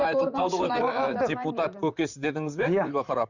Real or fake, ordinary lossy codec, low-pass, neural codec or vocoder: fake; none; 7.2 kHz; vocoder, 44.1 kHz, 128 mel bands, Pupu-Vocoder